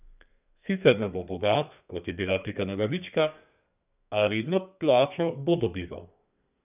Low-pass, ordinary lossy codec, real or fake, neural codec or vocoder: 3.6 kHz; none; fake; codec, 32 kHz, 1.9 kbps, SNAC